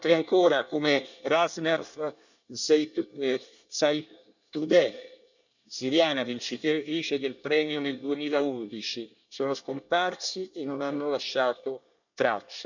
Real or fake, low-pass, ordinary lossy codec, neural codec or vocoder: fake; 7.2 kHz; none; codec, 24 kHz, 1 kbps, SNAC